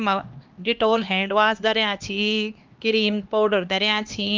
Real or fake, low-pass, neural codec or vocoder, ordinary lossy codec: fake; 7.2 kHz; codec, 16 kHz, 2 kbps, X-Codec, HuBERT features, trained on LibriSpeech; Opus, 24 kbps